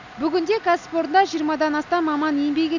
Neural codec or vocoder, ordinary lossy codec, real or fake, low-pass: none; none; real; 7.2 kHz